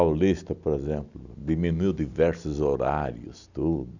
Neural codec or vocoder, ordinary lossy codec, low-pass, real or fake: none; none; 7.2 kHz; real